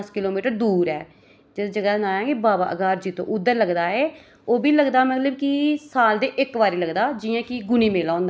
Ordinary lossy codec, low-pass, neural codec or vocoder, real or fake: none; none; none; real